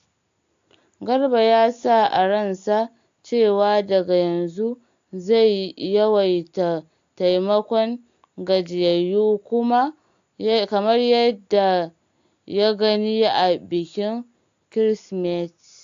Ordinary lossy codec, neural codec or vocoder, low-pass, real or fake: AAC, 64 kbps; none; 7.2 kHz; real